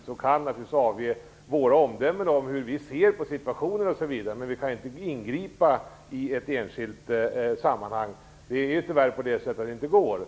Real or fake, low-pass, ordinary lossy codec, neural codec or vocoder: real; none; none; none